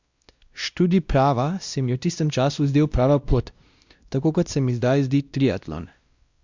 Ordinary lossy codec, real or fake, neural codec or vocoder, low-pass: Opus, 64 kbps; fake; codec, 16 kHz, 1 kbps, X-Codec, WavLM features, trained on Multilingual LibriSpeech; 7.2 kHz